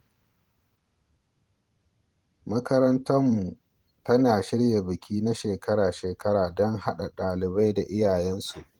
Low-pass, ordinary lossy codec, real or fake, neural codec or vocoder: 19.8 kHz; Opus, 24 kbps; fake; vocoder, 44.1 kHz, 128 mel bands every 512 samples, BigVGAN v2